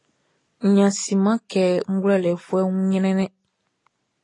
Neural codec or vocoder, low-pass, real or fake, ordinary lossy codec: none; 10.8 kHz; real; AAC, 32 kbps